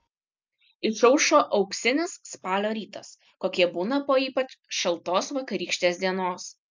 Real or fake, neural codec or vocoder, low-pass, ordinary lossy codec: real; none; 7.2 kHz; MP3, 64 kbps